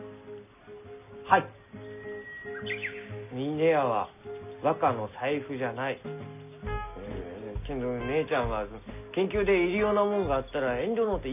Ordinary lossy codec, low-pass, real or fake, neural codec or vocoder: none; 3.6 kHz; real; none